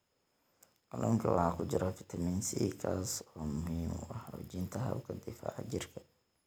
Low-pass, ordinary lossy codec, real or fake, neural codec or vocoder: none; none; real; none